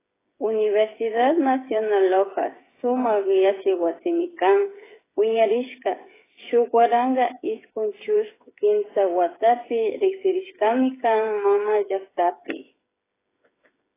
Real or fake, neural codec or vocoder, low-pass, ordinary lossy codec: fake; codec, 16 kHz, 8 kbps, FreqCodec, smaller model; 3.6 kHz; AAC, 16 kbps